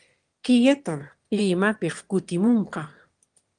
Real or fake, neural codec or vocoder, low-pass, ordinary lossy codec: fake; autoencoder, 22.05 kHz, a latent of 192 numbers a frame, VITS, trained on one speaker; 9.9 kHz; Opus, 24 kbps